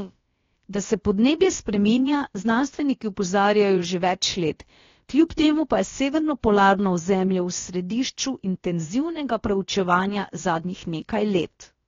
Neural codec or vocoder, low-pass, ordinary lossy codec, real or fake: codec, 16 kHz, about 1 kbps, DyCAST, with the encoder's durations; 7.2 kHz; AAC, 32 kbps; fake